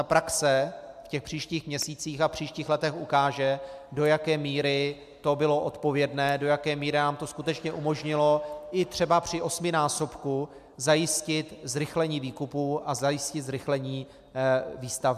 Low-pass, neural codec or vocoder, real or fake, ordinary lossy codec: 14.4 kHz; none; real; MP3, 96 kbps